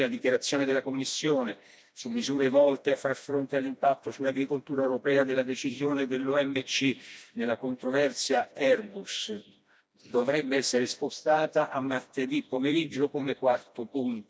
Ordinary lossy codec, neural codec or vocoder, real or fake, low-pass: none; codec, 16 kHz, 1 kbps, FreqCodec, smaller model; fake; none